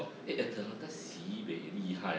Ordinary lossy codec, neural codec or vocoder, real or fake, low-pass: none; none; real; none